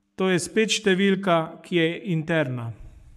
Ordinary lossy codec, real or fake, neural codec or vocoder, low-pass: none; fake; codec, 44.1 kHz, 7.8 kbps, Pupu-Codec; 14.4 kHz